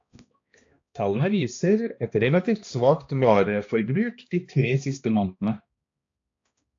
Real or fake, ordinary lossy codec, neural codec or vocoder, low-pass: fake; AAC, 48 kbps; codec, 16 kHz, 1 kbps, X-Codec, HuBERT features, trained on balanced general audio; 7.2 kHz